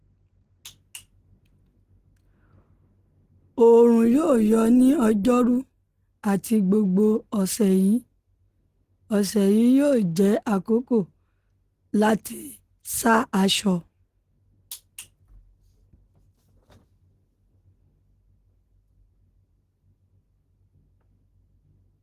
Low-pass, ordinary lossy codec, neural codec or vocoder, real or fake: 14.4 kHz; Opus, 16 kbps; none; real